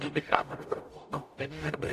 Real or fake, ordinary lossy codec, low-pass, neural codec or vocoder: fake; AAC, 64 kbps; 14.4 kHz; codec, 44.1 kHz, 0.9 kbps, DAC